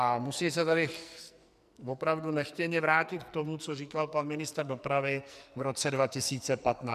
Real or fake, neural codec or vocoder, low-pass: fake; codec, 44.1 kHz, 2.6 kbps, SNAC; 14.4 kHz